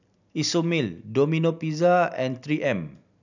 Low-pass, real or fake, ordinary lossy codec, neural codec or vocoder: 7.2 kHz; real; none; none